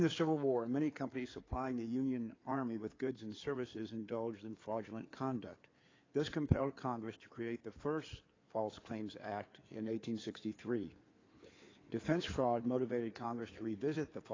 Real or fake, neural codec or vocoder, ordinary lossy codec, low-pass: fake; codec, 16 kHz in and 24 kHz out, 2.2 kbps, FireRedTTS-2 codec; AAC, 32 kbps; 7.2 kHz